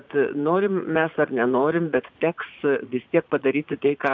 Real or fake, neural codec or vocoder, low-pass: fake; vocoder, 44.1 kHz, 80 mel bands, Vocos; 7.2 kHz